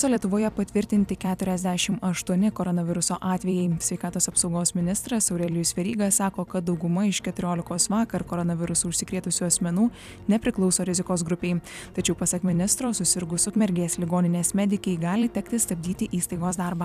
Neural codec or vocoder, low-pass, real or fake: vocoder, 44.1 kHz, 128 mel bands every 256 samples, BigVGAN v2; 14.4 kHz; fake